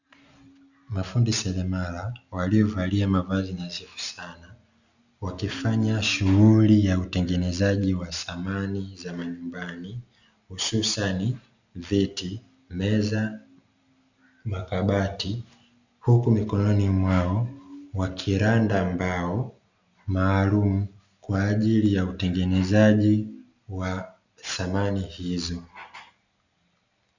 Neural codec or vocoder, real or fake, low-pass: none; real; 7.2 kHz